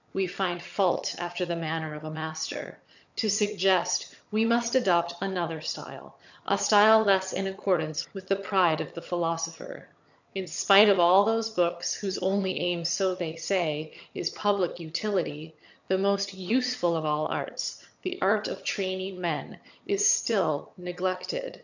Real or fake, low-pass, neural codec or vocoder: fake; 7.2 kHz; vocoder, 22.05 kHz, 80 mel bands, HiFi-GAN